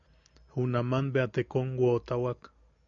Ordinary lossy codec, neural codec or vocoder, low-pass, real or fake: MP3, 96 kbps; none; 7.2 kHz; real